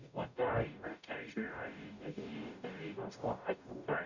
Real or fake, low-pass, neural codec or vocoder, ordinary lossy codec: fake; 7.2 kHz; codec, 44.1 kHz, 0.9 kbps, DAC; none